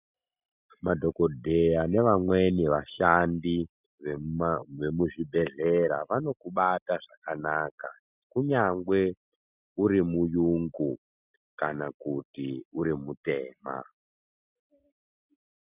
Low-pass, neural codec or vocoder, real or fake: 3.6 kHz; none; real